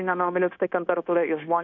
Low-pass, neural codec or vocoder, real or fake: 7.2 kHz; codec, 16 kHz, 0.9 kbps, LongCat-Audio-Codec; fake